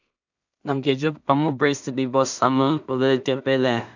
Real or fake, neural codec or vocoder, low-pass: fake; codec, 16 kHz in and 24 kHz out, 0.4 kbps, LongCat-Audio-Codec, two codebook decoder; 7.2 kHz